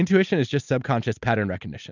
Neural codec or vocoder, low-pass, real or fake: none; 7.2 kHz; real